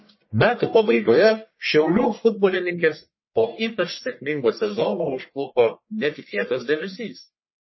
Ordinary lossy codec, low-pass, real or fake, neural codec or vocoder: MP3, 24 kbps; 7.2 kHz; fake; codec, 44.1 kHz, 1.7 kbps, Pupu-Codec